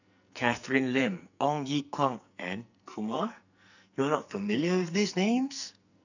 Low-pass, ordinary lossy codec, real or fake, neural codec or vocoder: 7.2 kHz; none; fake; codec, 44.1 kHz, 2.6 kbps, SNAC